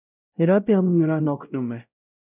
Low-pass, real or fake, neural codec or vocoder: 3.6 kHz; fake; codec, 16 kHz, 0.5 kbps, X-Codec, WavLM features, trained on Multilingual LibriSpeech